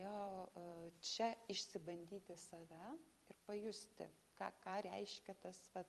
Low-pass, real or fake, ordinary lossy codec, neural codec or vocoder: 14.4 kHz; fake; Opus, 32 kbps; vocoder, 44.1 kHz, 128 mel bands every 256 samples, BigVGAN v2